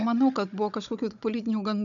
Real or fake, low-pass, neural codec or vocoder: fake; 7.2 kHz; codec, 16 kHz, 16 kbps, FunCodec, trained on Chinese and English, 50 frames a second